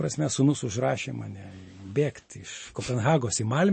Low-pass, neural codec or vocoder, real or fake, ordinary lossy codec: 9.9 kHz; none; real; MP3, 32 kbps